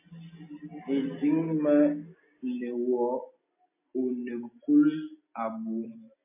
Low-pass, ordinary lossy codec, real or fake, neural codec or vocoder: 3.6 kHz; MP3, 24 kbps; real; none